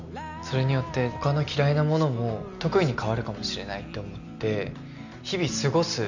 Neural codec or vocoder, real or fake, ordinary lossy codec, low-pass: none; real; none; 7.2 kHz